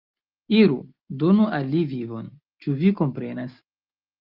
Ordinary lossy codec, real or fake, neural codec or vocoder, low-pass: Opus, 32 kbps; real; none; 5.4 kHz